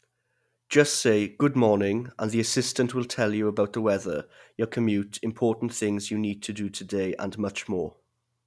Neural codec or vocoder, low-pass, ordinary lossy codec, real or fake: none; none; none; real